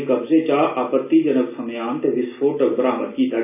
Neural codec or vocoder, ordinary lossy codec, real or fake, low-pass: none; none; real; 3.6 kHz